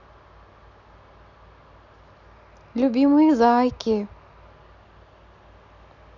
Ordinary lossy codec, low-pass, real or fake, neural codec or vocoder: none; 7.2 kHz; real; none